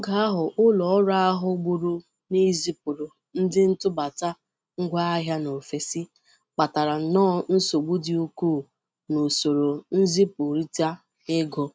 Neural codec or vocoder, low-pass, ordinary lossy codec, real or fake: none; none; none; real